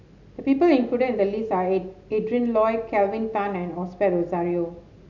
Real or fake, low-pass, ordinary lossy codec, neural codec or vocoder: real; 7.2 kHz; none; none